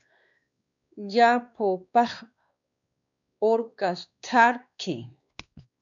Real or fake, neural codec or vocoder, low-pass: fake; codec, 16 kHz, 2 kbps, X-Codec, WavLM features, trained on Multilingual LibriSpeech; 7.2 kHz